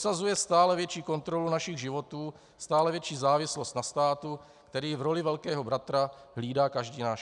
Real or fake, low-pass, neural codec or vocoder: real; 10.8 kHz; none